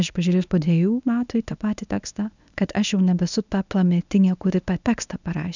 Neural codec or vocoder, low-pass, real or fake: codec, 24 kHz, 0.9 kbps, WavTokenizer, medium speech release version 1; 7.2 kHz; fake